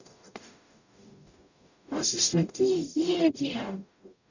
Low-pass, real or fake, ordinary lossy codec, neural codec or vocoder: 7.2 kHz; fake; none; codec, 44.1 kHz, 0.9 kbps, DAC